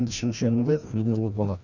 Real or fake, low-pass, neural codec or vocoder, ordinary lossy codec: fake; 7.2 kHz; codec, 16 kHz, 1 kbps, FreqCodec, larger model; none